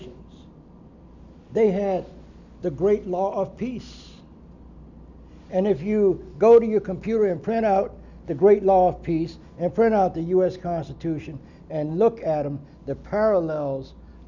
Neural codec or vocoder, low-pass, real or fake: none; 7.2 kHz; real